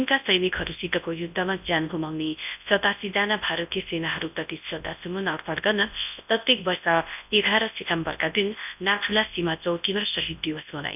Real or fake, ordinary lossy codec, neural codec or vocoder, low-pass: fake; none; codec, 24 kHz, 0.9 kbps, WavTokenizer, large speech release; 3.6 kHz